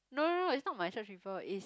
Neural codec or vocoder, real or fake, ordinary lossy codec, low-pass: none; real; none; none